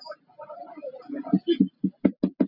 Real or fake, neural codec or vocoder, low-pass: real; none; 5.4 kHz